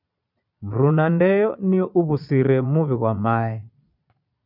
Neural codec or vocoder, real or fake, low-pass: vocoder, 22.05 kHz, 80 mel bands, Vocos; fake; 5.4 kHz